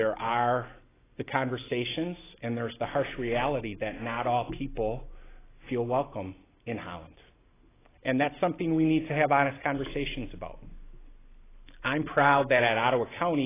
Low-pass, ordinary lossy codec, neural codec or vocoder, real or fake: 3.6 kHz; AAC, 16 kbps; none; real